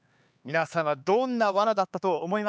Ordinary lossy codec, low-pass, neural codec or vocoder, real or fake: none; none; codec, 16 kHz, 4 kbps, X-Codec, HuBERT features, trained on LibriSpeech; fake